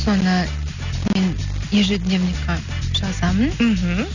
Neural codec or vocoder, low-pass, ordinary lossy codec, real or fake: none; 7.2 kHz; none; real